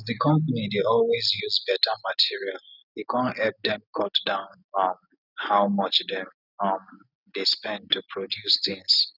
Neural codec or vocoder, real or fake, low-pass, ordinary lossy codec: none; real; 5.4 kHz; none